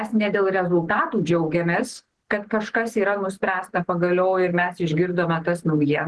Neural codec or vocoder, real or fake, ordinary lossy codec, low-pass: none; real; Opus, 16 kbps; 10.8 kHz